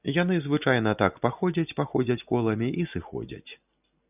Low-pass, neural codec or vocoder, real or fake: 3.6 kHz; none; real